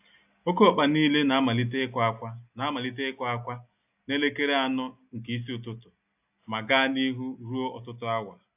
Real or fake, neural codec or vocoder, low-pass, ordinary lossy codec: real; none; 3.6 kHz; AAC, 32 kbps